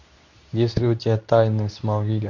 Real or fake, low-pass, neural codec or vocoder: fake; 7.2 kHz; codec, 24 kHz, 0.9 kbps, WavTokenizer, medium speech release version 2